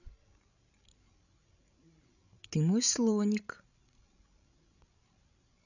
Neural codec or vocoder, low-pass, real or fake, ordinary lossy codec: codec, 16 kHz, 16 kbps, FreqCodec, larger model; 7.2 kHz; fake; none